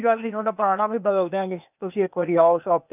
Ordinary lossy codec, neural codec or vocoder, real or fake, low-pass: none; codec, 16 kHz, 0.8 kbps, ZipCodec; fake; 3.6 kHz